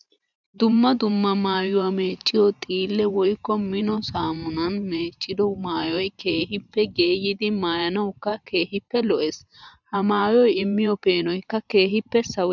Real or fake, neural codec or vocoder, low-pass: fake; vocoder, 44.1 kHz, 128 mel bands every 512 samples, BigVGAN v2; 7.2 kHz